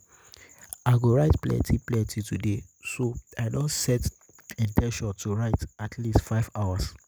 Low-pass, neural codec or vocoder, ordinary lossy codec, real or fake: none; none; none; real